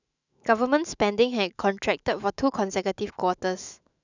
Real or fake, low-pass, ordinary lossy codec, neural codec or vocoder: fake; 7.2 kHz; none; autoencoder, 48 kHz, 128 numbers a frame, DAC-VAE, trained on Japanese speech